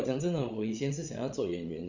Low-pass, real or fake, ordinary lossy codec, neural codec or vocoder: 7.2 kHz; fake; Opus, 64 kbps; codec, 16 kHz, 16 kbps, FreqCodec, larger model